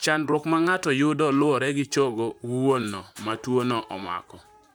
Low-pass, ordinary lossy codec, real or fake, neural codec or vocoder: none; none; fake; vocoder, 44.1 kHz, 128 mel bands, Pupu-Vocoder